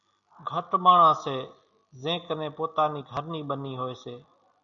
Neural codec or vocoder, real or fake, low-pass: none; real; 7.2 kHz